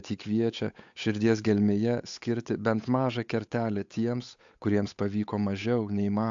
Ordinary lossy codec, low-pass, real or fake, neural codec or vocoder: AAC, 64 kbps; 7.2 kHz; fake; codec, 16 kHz, 8 kbps, FunCodec, trained on Chinese and English, 25 frames a second